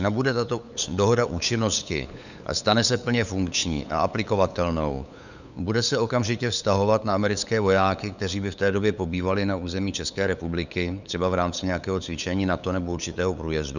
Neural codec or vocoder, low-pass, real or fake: codec, 16 kHz, 8 kbps, FunCodec, trained on LibriTTS, 25 frames a second; 7.2 kHz; fake